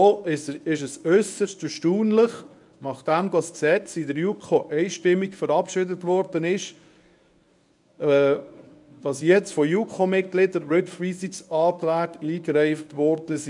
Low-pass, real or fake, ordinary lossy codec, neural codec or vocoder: 10.8 kHz; fake; none; codec, 24 kHz, 0.9 kbps, WavTokenizer, medium speech release version 1